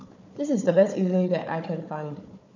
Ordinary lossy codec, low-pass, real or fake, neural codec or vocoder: none; 7.2 kHz; fake; codec, 16 kHz, 4 kbps, FunCodec, trained on Chinese and English, 50 frames a second